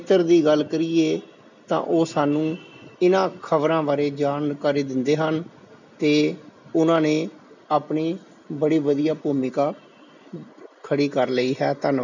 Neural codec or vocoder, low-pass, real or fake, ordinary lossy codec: none; 7.2 kHz; real; none